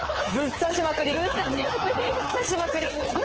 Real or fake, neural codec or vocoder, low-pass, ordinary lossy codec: fake; codec, 24 kHz, 3.1 kbps, DualCodec; 7.2 kHz; Opus, 16 kbps